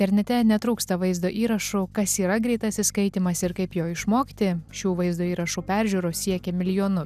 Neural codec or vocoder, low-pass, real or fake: none; 14.4 kHz; real